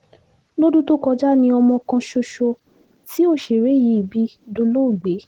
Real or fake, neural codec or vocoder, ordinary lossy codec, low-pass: real; none; Opus, 16 kbps; 19.8 kHz